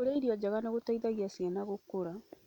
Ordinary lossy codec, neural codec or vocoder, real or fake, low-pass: none; none; real; 19.8 kHz